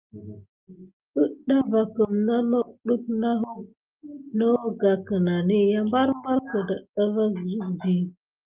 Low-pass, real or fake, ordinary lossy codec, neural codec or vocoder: 3.6 kHz; real; Opus, 32 kbps; none